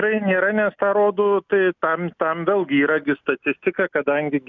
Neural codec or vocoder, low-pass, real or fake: none; 7.2 kHz; real